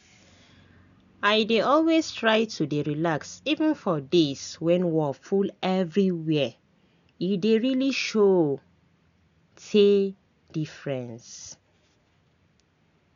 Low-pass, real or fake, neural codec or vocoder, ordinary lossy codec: 7.2 kHz; real; none; none